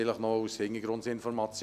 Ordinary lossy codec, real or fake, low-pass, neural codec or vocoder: none; real; 14.4 kHz; none